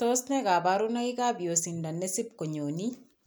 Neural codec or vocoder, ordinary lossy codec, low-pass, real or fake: none; none; none; real